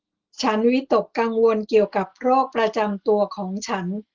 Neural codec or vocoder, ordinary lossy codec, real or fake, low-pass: none; Opus, 16 kbps; real; 7.2 kHz